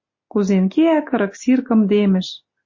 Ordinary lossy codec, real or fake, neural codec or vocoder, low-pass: MP3, 32 kbps; real; none; 7.2 kHz